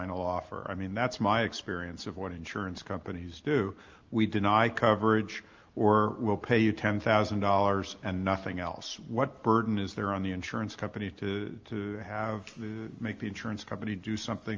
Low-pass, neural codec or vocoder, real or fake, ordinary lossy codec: 7.2 kHz; none; real; Opus, 32 kbps